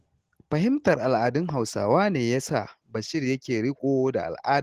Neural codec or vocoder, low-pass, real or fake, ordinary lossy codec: none; 14.4 kHz; real; Opus, 16 kbps